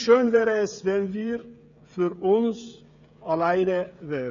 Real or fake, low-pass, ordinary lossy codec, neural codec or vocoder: fake; 7.2 kHz; none; codec, 16 kHz, 16 kbps, FreqCodec, smaller model